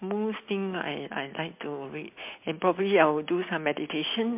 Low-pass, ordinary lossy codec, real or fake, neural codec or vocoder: 3.6 kHz; MP3, 32 kbps; real; none